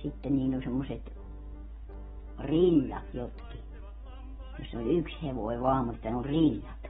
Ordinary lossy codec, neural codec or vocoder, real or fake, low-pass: AAC, 16 kbps; none; real; 19.8 kHz